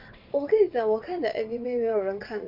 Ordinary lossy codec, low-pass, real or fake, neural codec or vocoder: MP3, 48 kbps; 5.4 kHz; fake; vocoder, 22.05 kHz, 80 mel bands, WaveNeXt